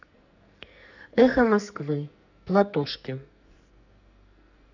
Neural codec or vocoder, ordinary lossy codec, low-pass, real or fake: codec, 44.1 kHz, 2.6 kbps, SNAC; none; 7.2 kHz; fake